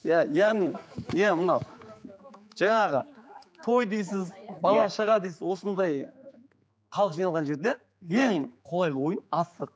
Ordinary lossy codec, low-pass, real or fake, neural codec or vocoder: none; none; fake; codec, 16 kHz, 4 kbps, X-Codec, HuBERT features, trained on general audio